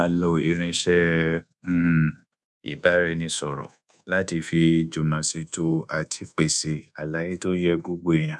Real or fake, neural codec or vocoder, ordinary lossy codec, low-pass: fake; codec, 24 kHz, 1.2 kbps, DualCodec; none; none